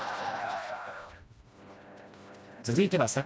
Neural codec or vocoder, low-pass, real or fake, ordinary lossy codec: codec, 16 kHz, 1 kbps, FreqCodec, smaller model; none; fake; none